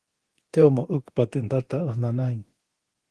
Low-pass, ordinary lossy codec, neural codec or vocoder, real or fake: 10.8 kHz; Opus, 16 kbps; codec, 24 kHz, 0.9 kbps, DualCodec; fake